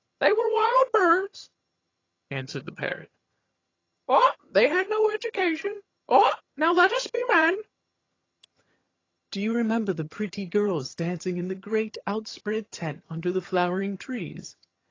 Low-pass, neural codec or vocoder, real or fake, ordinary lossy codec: 7.2 kHz; vocoder, 22.05 kHz, 80 mel bands, HiFi-GAN; fake; AAC, 32 kbps